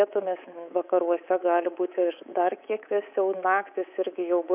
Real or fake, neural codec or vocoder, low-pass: fake; codec, 24 kHz, 3.1 kbps, DualCodec; 3.6 kHz